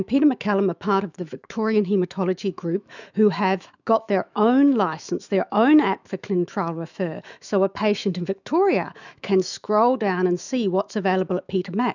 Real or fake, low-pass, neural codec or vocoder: fake; 7.2 kHz; autoencoder, 48 kHz, 128 numbers a frame, DAC-VAE, trained on Japanese speech